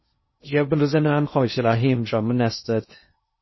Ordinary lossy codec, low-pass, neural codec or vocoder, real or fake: MP3, 24 kbps; 7.2 kHz; codec, 16 kHz in and 24 kHz out, 0.6 kbps, FocalCodec, streaming, 2048 codes; fake